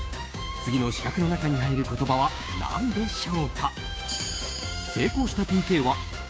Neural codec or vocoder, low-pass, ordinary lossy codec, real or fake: codec, 16 kHz, 6 kbps, DAC; none; none; fake